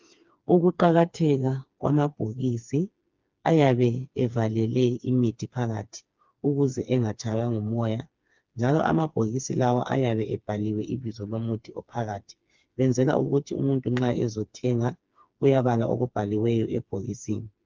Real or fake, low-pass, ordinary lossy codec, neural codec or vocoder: fake; 7.2 kHz; Opus, 24 kbps; codec, 16 kHz, 4 kbps, FreqCodec, smaller model